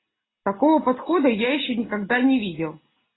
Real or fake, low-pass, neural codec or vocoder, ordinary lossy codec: real; 7.2 kHz; none; AAC, 16 kbps